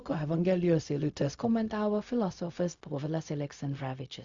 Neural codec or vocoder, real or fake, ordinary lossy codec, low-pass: codec, 16 kHz, 0.4 kbps, LongCat-Audio-Codec; fake; Opus, 64 kbps; 7.2 kHz